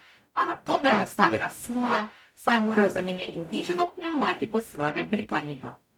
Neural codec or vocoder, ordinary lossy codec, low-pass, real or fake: codec, 44.1 kHz, 0.9 kbps, DAC; none; 19.8 kHz; fake